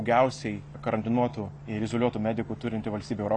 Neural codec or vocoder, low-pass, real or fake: none; 9.9 kHz; real